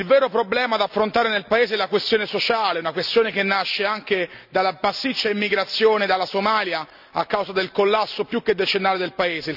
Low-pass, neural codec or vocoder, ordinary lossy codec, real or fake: 5.4 kHz; none; none; real